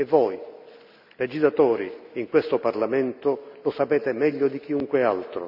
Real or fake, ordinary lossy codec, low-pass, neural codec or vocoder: real; none; 5.4 kHz; none